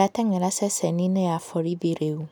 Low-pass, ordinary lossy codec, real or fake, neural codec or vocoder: none; none; real; none